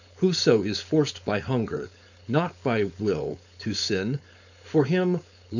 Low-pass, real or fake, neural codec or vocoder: 7.2 kHz; fake; codec, 16 kHz, 4.8 kbps, FACodec